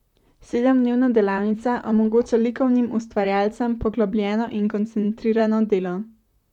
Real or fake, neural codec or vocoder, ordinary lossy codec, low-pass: fake; vocoder, 44.1 kHz, 128 mel bands, Pupu-Vocoder; none; 19.8 kHz